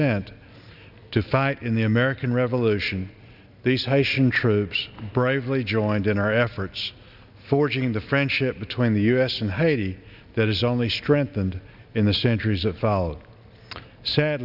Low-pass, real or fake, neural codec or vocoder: 5.4 kHz; real; none